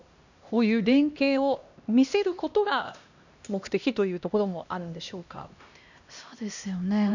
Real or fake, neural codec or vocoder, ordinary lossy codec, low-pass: fake; codec, 16 kHz, 1 kbps, X-Codec, HuBERT features, trained on LibriSpeech; none; 7.2 kHz